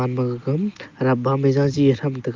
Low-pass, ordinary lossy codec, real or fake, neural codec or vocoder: 7.2 kHz; Opus, 24 kbps; real; none